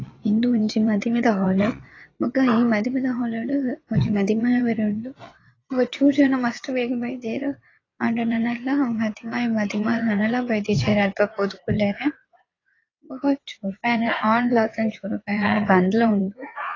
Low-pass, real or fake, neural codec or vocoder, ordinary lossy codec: 7.2 kHz; fake; vocoder, 22.05 kHz, 80 mel bands, WaveNeXt; AAC, 32 kbps